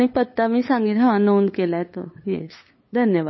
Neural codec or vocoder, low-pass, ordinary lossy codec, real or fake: codec, 16 kHz, 8 kbps, FunCodec, trained on LibriTTS, 25 frames a second; 7.2 kHz; MP3, 24 kbps; fake